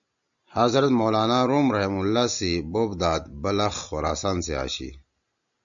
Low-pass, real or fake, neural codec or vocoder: 7.2 kHz; real; none